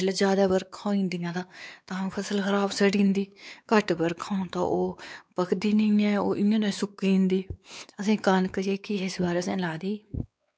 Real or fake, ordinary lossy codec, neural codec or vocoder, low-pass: fake; none; codec, 16 kHz, 4 kbps, X-Codec, WavLM features, trained on Multilingual LibriSpeech; none